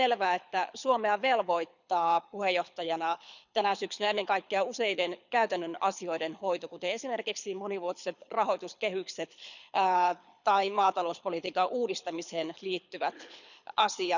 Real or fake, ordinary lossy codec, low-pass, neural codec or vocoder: fake; none; 7.2 kHz; codec, 24 kHz, 6 kbps, HILCodec